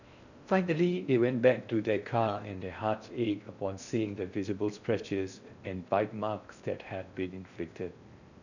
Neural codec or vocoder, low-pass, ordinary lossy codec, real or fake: codec, 16 kHz in and 24 kHz out, 0.6 kbps, FocalCodec, streaming, 4096 codes; 7.2 kHz; none; fake